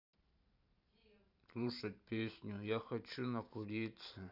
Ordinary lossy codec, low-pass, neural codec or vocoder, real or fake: none; 5.4 kHz; vocoder, 44.1 kHz, 128 mel bands every 512 samples, BigVGAN v2; fake